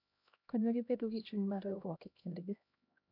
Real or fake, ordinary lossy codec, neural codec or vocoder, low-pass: fake; none; codec, 16 kHz, 1 kbps, X-Codec, HuBERT features, trained on LibriSpeech; 5.4 kHz